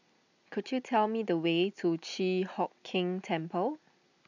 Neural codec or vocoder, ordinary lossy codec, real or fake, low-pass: none; none; real; 7.2 kHz